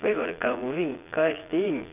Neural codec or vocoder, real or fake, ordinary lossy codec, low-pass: vocoder, 22.05 kHz, 80 mel bands, Vocos; fake; none; 3.6 kHz